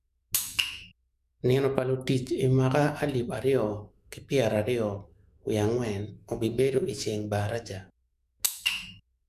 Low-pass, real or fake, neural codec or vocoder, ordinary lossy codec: 14.4 kHz; fake; autoencoder, 48 kHz, 128 numbers a frame, DAC-VAE, trained on Japanese speech; none